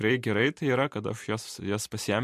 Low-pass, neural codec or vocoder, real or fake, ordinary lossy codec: 14.4 kHz; none; real; MP3, 64 kbps